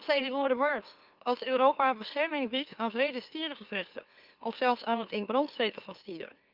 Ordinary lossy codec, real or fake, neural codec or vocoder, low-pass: Opus, 24 kbps; fake; autoencoder, 44.1 kHz, a latent of 192 numbers a frame, MeloTTS; 5.4 kHz